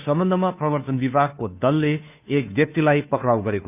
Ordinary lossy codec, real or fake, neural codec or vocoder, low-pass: none; fake; codec, 16 kHz, 2 kbps, FunCodec, trained on Chinese and English, 25 frames a second; 3.6 kHz